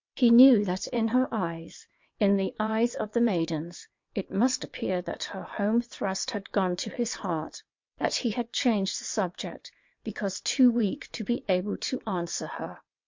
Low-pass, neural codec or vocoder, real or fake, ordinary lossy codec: 7.2 kHz; vocoder, 22.05 kHz, 80 mel bands, WaveNeXt; fake; MP3, 48 kbps